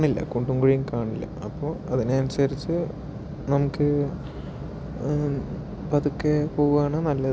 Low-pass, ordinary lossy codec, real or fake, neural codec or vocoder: none; none; real; none